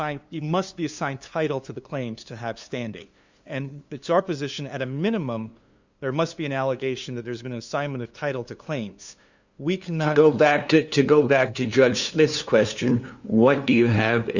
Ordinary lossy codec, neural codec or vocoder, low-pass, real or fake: Opus, 64 kbps; codec, 16 kHz, 2 kbps, FunCodec, trained on LibriTTS, 25 frames a second; 7.2 kHz; fake